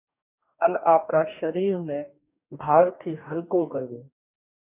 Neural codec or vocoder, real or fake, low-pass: codec, 44.1 kHz, 2.6 kbps, DAC; fake; 3.6 kHz